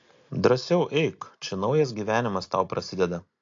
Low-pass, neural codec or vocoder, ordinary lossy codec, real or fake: 7.2 kHz; none; AAC, 48 kbps; real